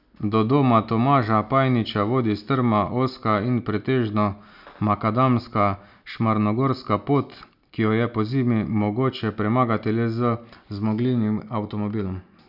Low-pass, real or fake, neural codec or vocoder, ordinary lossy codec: 5.4 kHz; real; none; none